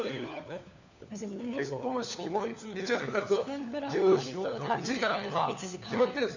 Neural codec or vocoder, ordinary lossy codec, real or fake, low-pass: codec, 16 kHz, 4 kbps, FunCodec, trained on LibriTTS, 50 frames a second; none; fake; 7.2 kHz